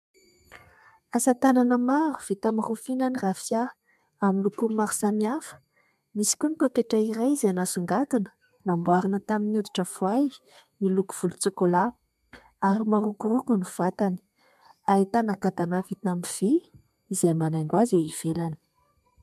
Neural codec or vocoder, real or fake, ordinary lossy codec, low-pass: codec, 32 kHz, 1.9 kbps, SNAC; fake; MP3, 96 kbps; 14.4 kHz